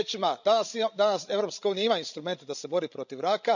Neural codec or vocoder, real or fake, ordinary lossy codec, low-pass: codec, 16 kHz, 16 kbps, FreqCodec, larger model; fake; none; 7.2 kHz